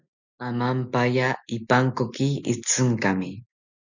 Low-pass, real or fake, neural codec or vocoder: 7.2 kHz; real; none